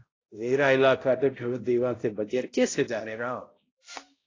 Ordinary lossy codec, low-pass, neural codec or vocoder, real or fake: AAC, 32 kbps; 7.2 kHz; codec, 16 kHz, 0.5 kbps, X-Codec, HuBERT features, trained on balanced general audio; fake